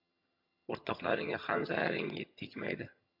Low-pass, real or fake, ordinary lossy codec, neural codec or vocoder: 5.4 kHz; fake; MP3, 48 kbps; vocoder, 22.05 kHz, 80 mel bands, HiFi-GAN